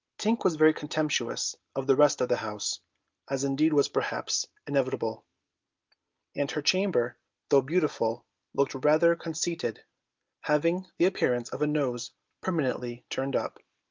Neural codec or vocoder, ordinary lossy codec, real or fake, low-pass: none; Opus, 24 kbps; real; 7.2 kHz